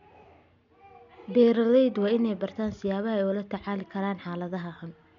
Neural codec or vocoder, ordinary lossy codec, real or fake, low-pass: none; none; real; 7.2 kHz